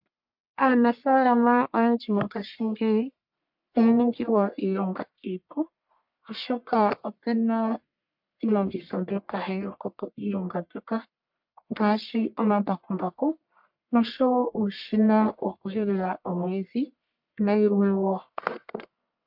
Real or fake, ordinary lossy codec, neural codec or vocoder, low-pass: fake; MP3, 48 kbps; codec, 44.1 kHz, 1.7 kbps, Pupu-Codec; 5.4 kHz